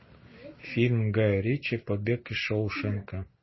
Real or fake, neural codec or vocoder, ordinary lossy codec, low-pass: real; none; MP3, 24 kbps; 7.2 kHz